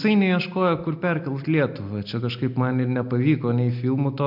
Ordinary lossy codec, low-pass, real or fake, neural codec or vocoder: MP3, 48 kbps; 5.4 kHz; real; none